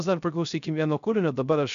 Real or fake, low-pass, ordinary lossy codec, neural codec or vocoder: fake; 7.2 kHz; AAC, 96 kbps; codec, 16 kHz, 0.3 kbps, FocalCodec